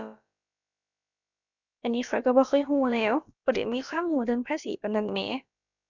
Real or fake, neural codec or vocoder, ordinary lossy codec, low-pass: fake; codec, 16 kHz, about 1 kbps, DyCAST, with the encoder's durations; none; 7.2 kHz